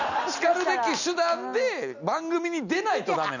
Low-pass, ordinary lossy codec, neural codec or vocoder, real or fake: 7.2 kHz; none; none; real